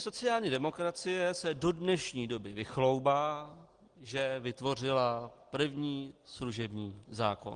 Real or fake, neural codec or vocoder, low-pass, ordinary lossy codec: real; none; 9.9 kHz; Opus, 24 kbps